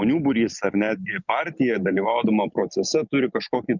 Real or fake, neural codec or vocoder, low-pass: real; none; 7.2 kHz